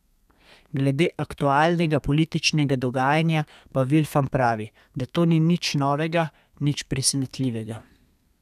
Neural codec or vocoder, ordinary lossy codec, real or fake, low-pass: codec, 32 kHz, 1.9 kbps, SNAC; none; fake; 14.4 kHz